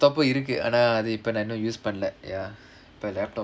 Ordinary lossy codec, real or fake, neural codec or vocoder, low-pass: none; real; none; none